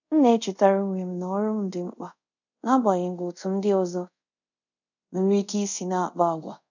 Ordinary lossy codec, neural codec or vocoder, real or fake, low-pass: none; codec, 24 kHz, 0.5 kbps, DualCodec; fake; 7.2 kHz